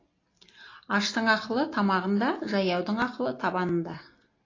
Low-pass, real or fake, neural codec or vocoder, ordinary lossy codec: 7.2 kHz; real; none; AAC, 32 kbps